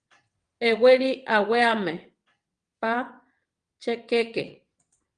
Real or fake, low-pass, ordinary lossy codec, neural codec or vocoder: fake; 9.9 kHz; Opus, 32 kbps; vocoder, 22.05 kHz, 80 mel bands, WaveNeXt